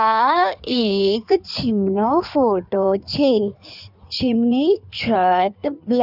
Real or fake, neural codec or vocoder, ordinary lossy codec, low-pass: fake; codec, 16 kHz in and 24 kHz out, 1.1 kbps, FireRedTTS-2 codec; none; 5.4 kHz